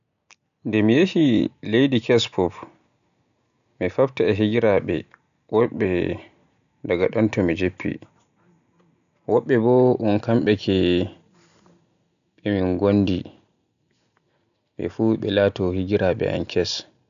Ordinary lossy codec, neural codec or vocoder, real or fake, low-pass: none; none; real; 7.2 kHz